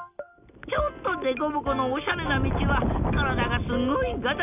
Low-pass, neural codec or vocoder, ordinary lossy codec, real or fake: 3.6 kHz; none; none; real